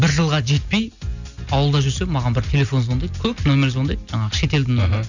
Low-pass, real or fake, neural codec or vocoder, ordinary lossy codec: 7.2 kHz; real; none; none